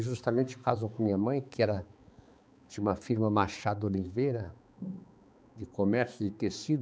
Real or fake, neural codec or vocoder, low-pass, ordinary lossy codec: fake; codec, 16 kHz, 4 kbps, X-Codec, HuBERT features, trained on balanced general audio; none; none